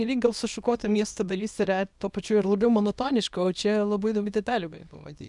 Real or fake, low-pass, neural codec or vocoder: fake; 10.8 kHz; codec, 24 kHz, 0.9 kbps, WavTokenizer, small release